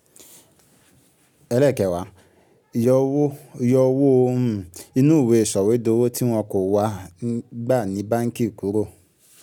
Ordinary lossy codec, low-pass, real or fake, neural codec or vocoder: none; 19.8 kHz; real; none